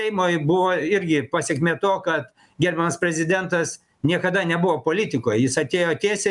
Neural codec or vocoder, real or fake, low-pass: none; real; 10.8 kHz